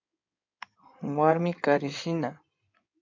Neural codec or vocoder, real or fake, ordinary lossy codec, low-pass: codec, 16 kHz in and 24 kHz out, 2.2 kbps, FireRedTTS-2 codec; fake; MP3, 64 kbps; 7.2 kHz